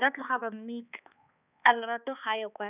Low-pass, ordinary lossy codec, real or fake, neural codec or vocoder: 3.6 kHz; none; fake; codec, 16 kHz, 2 kbps, X-Codec, HuBERT features, trained on balanced general audio